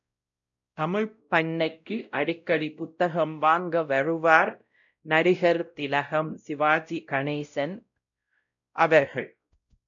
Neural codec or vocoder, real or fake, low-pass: codec, 16 kHz, 0.5 kbps, X-Codec, WavLM features, trained on Multilingual LibriSpeech; fake; 7.2 kHz